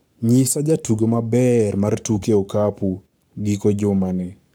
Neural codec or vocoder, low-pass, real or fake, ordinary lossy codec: codec, 44.1 kHz, 7.8 kbps, Pupu-Codec; none; fake; none